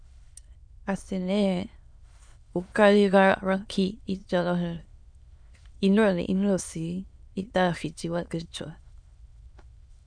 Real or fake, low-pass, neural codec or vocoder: fake; 9.9 kHz; autoencoder, 22.05 kHz, a latent of 192 numbers a frame, VITS, trained on many speakers